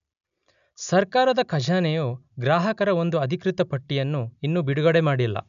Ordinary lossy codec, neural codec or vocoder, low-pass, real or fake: none; none; 7.2 kHz; real